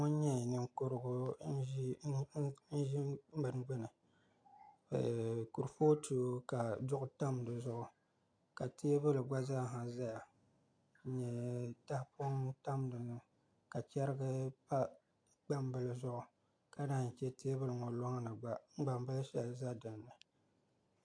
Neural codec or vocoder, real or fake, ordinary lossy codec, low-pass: none; real; AAC, 48 kbps; 9.9 kHz